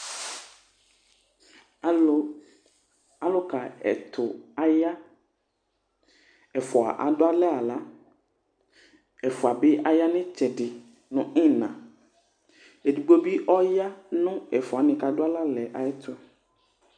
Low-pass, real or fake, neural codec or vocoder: 9.9 kHz; real; none